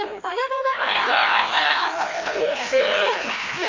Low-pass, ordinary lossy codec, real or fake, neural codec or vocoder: 7.2 kHz; AAC, 32 kbps; fake; codec, 16 kHz, 1 kbps, FreqCodec, larger model